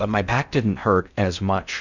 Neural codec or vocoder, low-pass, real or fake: codec, 16 kHz in and 24 kHz out, 0.6 kbps, FocalCodec, streaming, 4096 codes; 7.2 kHz; fake